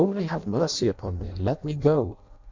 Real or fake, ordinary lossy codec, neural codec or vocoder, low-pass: fake; MP3, 64 kbps; codec, 16 kHz in and 24 kHz out, 0.6 kbps, FireRedTTS-2 codec; 7.2 kHz